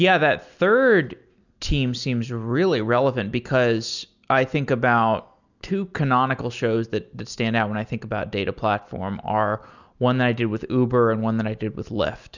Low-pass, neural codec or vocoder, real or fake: 7.2 kHz; none; real